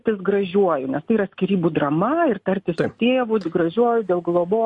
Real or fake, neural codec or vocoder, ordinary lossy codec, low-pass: real; none; MP3, 48 kbps; 10.8 kHz